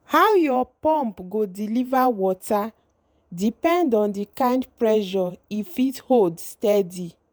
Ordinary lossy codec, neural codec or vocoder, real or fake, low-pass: none; vocoder, 48 kHz, 128 mel bands, Vocos; fake; none